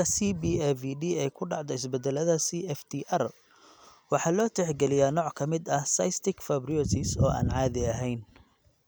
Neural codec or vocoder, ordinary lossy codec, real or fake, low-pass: none; none; real; none